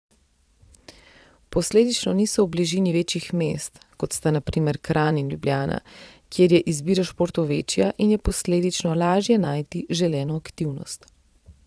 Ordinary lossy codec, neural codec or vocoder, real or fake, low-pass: none; vocoder, 22.05 kHz, 80 mel bands, Vocos; fake; none